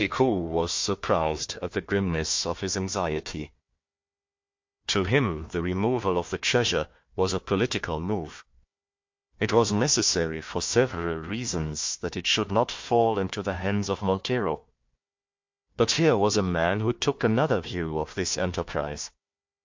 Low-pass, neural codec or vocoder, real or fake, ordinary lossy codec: 7.2 kHz; codec, 16 kHz, 1 kbps, FunCodec, trained on Chinese and English, 50 frames a second; fake; MP3, 48 kbps